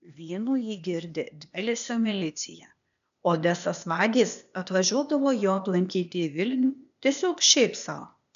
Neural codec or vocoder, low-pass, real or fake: codec, 16 kHz, 0.8 kbps, ZipCodec; 7.2 kHz; fake